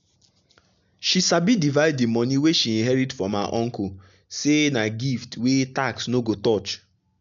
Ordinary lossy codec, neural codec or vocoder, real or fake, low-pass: none; none; real; 7.2 kHz